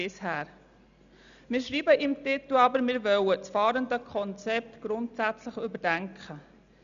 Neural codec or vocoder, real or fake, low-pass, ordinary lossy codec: none; real; 7.2 kHz; none